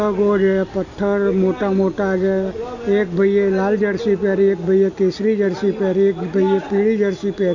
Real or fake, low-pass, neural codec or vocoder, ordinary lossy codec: real; 7.2 kHz; none; none